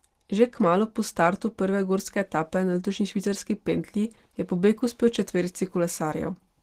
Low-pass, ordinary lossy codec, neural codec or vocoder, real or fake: 14.4 kHz; Opus, 16 kbps; none; real